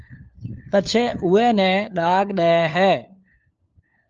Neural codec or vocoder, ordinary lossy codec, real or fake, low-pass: codec, 16 kHz, 4 kbps, FunCodec, trained on LibriTTS, 50 frames a second; Opus, 24 kbps; fake; 7.2 kHz